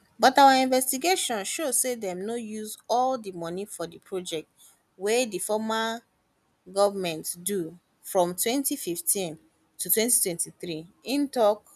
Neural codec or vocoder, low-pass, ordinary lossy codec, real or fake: none; 14.4 kHz; none; real